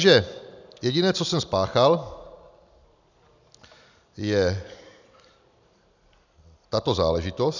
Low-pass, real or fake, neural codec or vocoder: 7.2 kHz; real; none